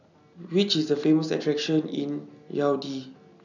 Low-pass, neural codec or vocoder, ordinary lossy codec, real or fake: 7.2 kHz; none; none; real